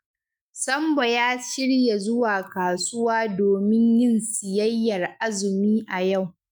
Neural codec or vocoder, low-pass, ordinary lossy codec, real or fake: autoencoder, 48 kHz, 128 numbers a frame, DAC-VAE, trained on Japanese speech; none; none; fake